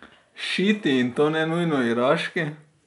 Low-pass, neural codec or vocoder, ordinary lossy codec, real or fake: 10.8 kHz; none; none; real